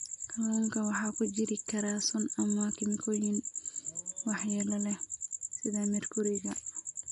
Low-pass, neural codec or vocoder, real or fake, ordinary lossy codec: 10.8 kHz; none; real; MP3, 64 kbps